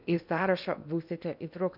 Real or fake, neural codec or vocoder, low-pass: fake; codec, 16 kHz in and 24 kHz out, 0.8 kbps, FocalCodec, streaming, 65536 codes; 5.4 kHz